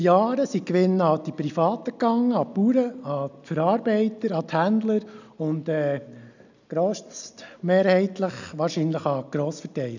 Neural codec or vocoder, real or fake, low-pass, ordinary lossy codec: none; real; 7.2 kHz; none